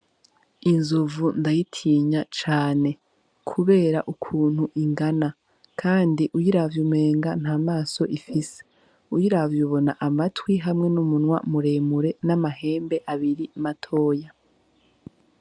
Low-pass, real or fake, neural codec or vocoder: 9.9 kHz; real; none